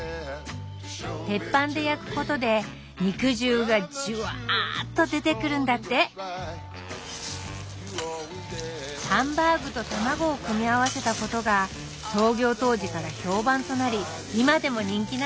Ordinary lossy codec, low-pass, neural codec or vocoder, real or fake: none; none; none; real